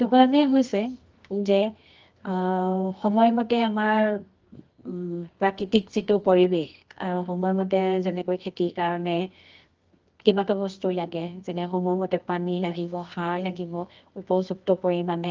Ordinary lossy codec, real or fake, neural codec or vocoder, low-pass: Opus, 24 kbps; fake; codec, 24 kHz, 0.9 kbps, WavTokenizer, medium music audio release; 7.2 kHz